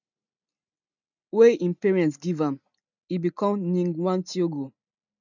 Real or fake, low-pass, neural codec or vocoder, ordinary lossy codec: real; 7.2 kHz; none; none